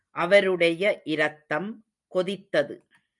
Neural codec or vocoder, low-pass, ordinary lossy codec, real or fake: none; 10.8 kHz; MP3, 96 kbps; real